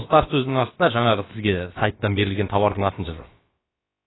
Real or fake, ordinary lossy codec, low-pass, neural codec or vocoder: fake; AAC, 16 kbps; 7.2 kHz; codec, 16 kHz, about 1 kbps, DyCAST, with the encoder's durations